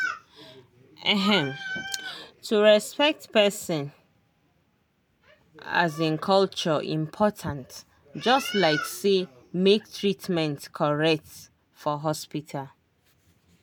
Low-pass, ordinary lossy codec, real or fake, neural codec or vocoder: none; none; real; none